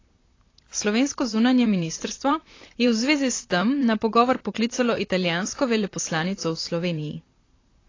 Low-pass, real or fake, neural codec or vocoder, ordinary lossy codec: 7.2 kHz; fake; vocoder, 44.1 kHz, 128 mel bands, Pupu-Vocoder; AAC, 32 kbps